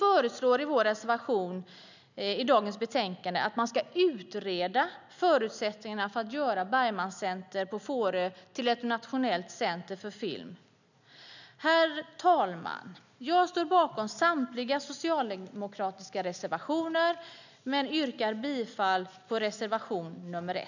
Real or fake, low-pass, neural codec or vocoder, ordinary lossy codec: real; 7.2 kHz; none; none